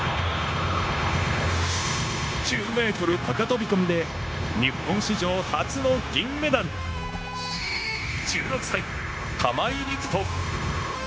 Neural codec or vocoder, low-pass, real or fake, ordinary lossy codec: codec, 16 kHz, 0.9 kbps, LongCat-Audio-Codec; none; fake; none